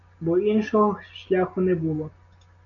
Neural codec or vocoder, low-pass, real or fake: none; 7.2 kHz; real